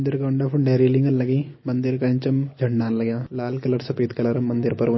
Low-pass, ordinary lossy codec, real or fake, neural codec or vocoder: 7.2 kHz; MP3, 24 kbps; real; none